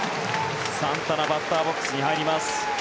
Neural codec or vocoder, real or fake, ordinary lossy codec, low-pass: none; real; none; none